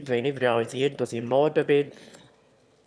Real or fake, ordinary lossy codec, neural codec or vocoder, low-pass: fake; none; autoencoder, 22.05 kHz, a latent of 192 numbers a frame, VITS, trained on one speaker; none